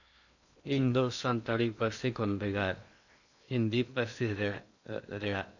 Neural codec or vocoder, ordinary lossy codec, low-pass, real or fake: codec, 16 kHz in and 24 kHz out, 0.8 kbps, FocalCodec, streaming, 65536 codes; none; 7.2 kHz; fake